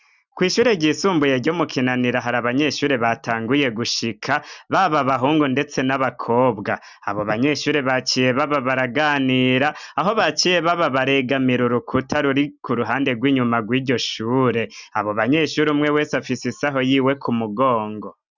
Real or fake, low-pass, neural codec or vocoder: real; 7.2 kHz; none